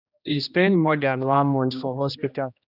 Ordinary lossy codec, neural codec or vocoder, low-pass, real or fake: none; codec, 16 kHz, 1 kbps, X-Codec, HuBERT features, trained on general audio; 5.4 kHz; fake